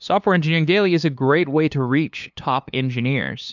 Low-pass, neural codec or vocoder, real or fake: 7.2 kHz; codec, 16 kHz, 2 kbps, FunCodec, trained on LibriTTS, 25 frames a second; fake